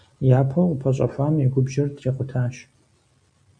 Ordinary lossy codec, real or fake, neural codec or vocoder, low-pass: AAC, 64 kbps; real; none; 9.9 kHz